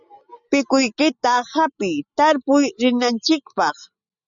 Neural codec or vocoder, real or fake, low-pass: none; real; 7.2 kHz